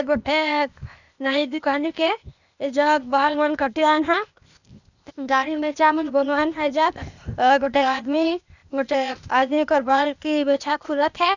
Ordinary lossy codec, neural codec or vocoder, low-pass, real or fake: none; codec, 16 kHz, 0.8 kbps, ZipCodec; 7.2 kHz; fake